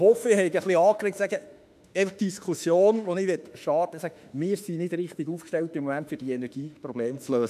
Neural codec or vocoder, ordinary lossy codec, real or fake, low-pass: autoencoder, 48 kHz, 32 numbers a frame, DAC-VAE, trained on Japanese speech; none; fake; 14.4 kHz